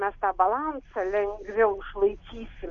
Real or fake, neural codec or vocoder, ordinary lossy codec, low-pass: real; none; MP3, 96 kbps; 7.2 kHz